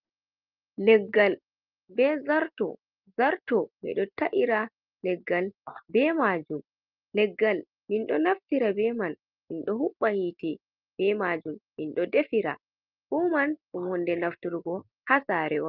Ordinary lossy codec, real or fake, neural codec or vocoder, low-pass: Opus, 24 kbps; real; none; 5.4 kHz